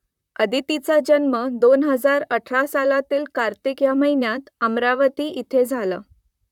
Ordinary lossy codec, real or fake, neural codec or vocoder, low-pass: none; fake; vocoder, 44.1 kHz, 128 mel bands, Pupu-Vocoder; 19.8 kHz